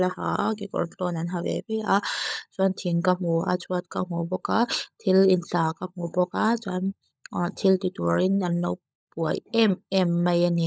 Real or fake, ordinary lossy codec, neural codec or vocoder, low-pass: fake; none; codec, 16 kHz, 16 kbps, FunCodec, trained on LibriTTS, 50 frames a second; none